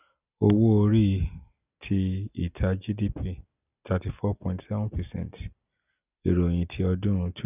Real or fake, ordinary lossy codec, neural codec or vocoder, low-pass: real; none; none; 3.6 kHz